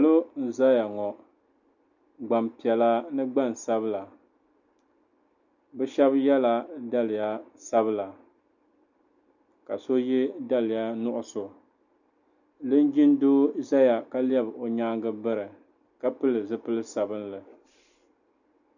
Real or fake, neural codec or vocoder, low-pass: real; none; 7.2 kHz